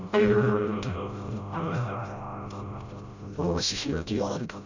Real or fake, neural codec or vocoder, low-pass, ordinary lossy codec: fake; codec, 16 kHz, 0.5 kbps, FreqCodec, smaller model; 7.2 kHz; none